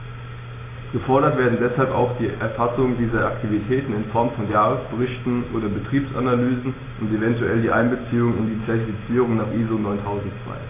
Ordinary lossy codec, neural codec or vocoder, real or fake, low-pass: AAC, 16 kbps; none; real; 3.6 kHz